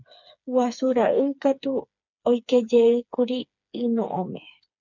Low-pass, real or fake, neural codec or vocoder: 7.2 kHz; fake; codec, 16 kHz, 4 kbps, FreqCodec, smaller model